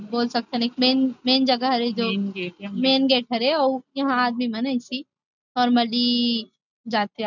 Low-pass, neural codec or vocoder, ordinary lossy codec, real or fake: 7.2 kHz; none; none; real